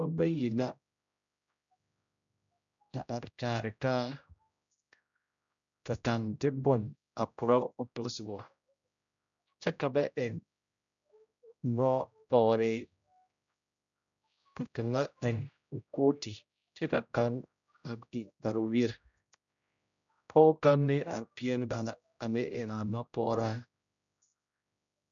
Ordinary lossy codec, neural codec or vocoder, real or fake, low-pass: MP3, 96 kbps; codec, 16 kHz, 0.5 kbps, X-Codec, HuBERT features, trained on general audio; fake; 7.2 kHz